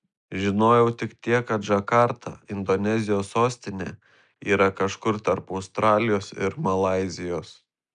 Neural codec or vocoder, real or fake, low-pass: none; real; 9.9 kHz